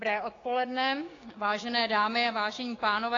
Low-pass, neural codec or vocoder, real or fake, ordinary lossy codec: 7.2 kHz; codec, 16 kHz, 6 kbps, DAC; fake; AAC, 32 kbps